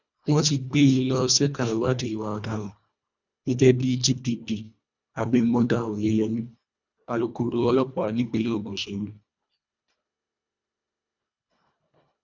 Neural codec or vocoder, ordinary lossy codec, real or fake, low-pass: codec, 24 kHz, 1.5 kbps, HILCodec; none; fake; 7.2 kHz